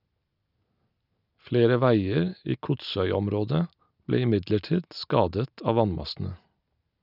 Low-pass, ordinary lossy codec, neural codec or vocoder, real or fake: 5.4 kHz; none; none; real